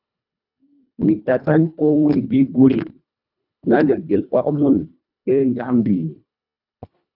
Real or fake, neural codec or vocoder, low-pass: fake; codec, 24 kHz, 1.5 kbps, HILCodec; 5.4 kHz